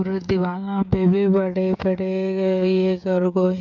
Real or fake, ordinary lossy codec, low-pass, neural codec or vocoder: fake; none; 7.2 kHz; codec, 16 kHz, 16 kbps, FreqCodec, smaller model